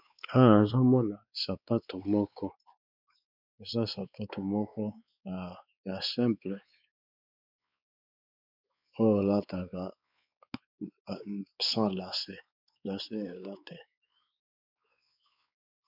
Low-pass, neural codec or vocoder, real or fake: 5.4 kHz; codec, 16 kHz, 4 kbps, X-Codec, WavLM features, trained on Multilingual LibriSpeech; fake